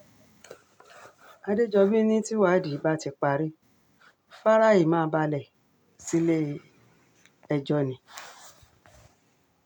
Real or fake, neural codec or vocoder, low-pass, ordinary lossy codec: real; none; 19.8 kHz; none